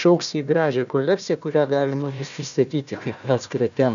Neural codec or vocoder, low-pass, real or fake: codec, 16 kHz, 1 kbps, FunCodec, trained on Chinese and English, 50 frames a second; 7.2 kHz; fake